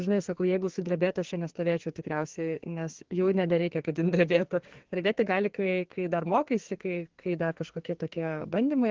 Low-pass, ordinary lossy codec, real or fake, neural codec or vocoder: 7.2 kHz; Opus, 16 kbps; fake; codec, 44.1 kHz, 2.6 kbps, SNAC